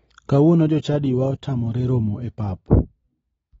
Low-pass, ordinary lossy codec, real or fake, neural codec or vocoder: 19.8 kHz; AAC, 24 kbps; real; none